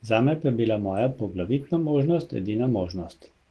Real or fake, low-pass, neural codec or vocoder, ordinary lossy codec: real; 10.8 kHz; none; Opus, 16 kbps